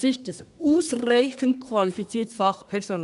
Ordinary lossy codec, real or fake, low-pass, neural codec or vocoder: none; fake; 10.8 kHz; codec, 24 kHz, 1 kbps, SNAC